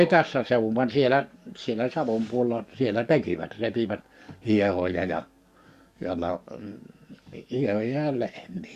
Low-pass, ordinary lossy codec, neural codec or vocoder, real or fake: 14.4 kHz; Opus, 64 kbps; codec, 44.1 kHz, 7.8 kbps, DAC; fake